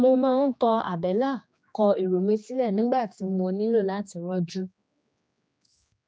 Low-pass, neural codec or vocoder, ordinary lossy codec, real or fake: none; codec, 16 kHz, 2 kbps, X-Codec, HuBERT features, trained on general audio; none; fake